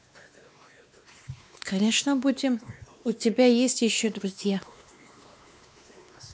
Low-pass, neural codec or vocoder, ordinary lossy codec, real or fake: none; codec, 16 kHz, 2 kbps, X-Codec, WavLM features, trained on Multilingual LibriSpeech; none; fake